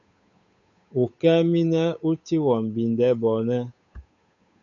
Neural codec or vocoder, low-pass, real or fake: codec, 16 kHz, 8 kbps, FunCodec, trained on Chinese and English, 25 frames a second; 7.2 kHz; fake